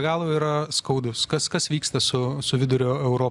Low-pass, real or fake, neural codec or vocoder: 10.8 kHz; real; none